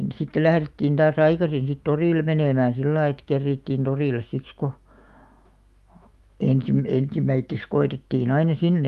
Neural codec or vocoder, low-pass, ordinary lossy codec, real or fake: autoencoder, 48 kHz, 128 numbers a frame, DAC-VAE, trained on Japanese speech; 14.4 kHz; Opus, 32 kbps; fake